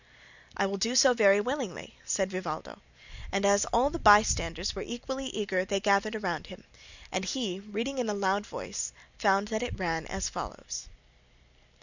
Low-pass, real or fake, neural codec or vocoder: 7.2 kHz; real; none